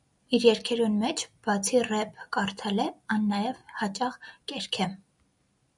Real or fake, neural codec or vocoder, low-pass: real; none; 10.8 kHz